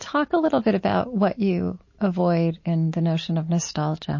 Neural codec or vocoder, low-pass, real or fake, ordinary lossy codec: none; 7.2 kHz; real; MP3, 32 kbps